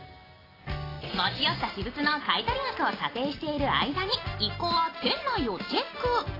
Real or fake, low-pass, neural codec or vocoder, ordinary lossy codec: real; 5.4 kHz; none; AAC, 24 kbps